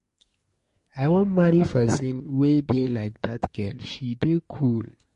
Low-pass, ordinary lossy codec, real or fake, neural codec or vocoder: 10.8 kHz; MP3, 48 kbps; fake; codec, 24 kHz, 1 kbps, SNAC